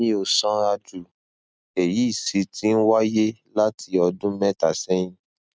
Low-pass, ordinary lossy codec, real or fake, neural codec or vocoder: none; none; real; none